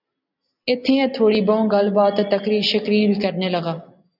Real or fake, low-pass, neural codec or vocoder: real; 5.4 kHz; none